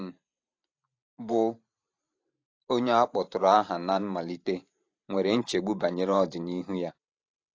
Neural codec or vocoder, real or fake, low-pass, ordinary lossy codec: vocoder, 44.1 kHz, 128 mel bands every 512 samples, BigVGAN v2; fake; 7.2 kHz; AAC, 48 kbps